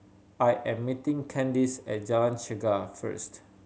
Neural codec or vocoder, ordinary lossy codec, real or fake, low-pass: none; none; real; none